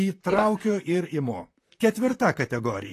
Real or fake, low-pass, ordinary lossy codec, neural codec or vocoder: fake; 14.4 kHz; AAC, 48 kbps; vocoder, 44.1 kHz, 128 mel bands, Pupu-Vocoder